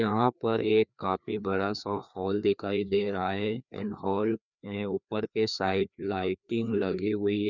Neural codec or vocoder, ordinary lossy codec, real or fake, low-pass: codec, 16 kHz, 2 kbps, FreqCodec, larger model; none; fake; 7.2 kHz